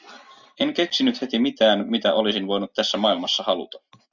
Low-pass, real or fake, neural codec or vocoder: 7.2 kHz; real; none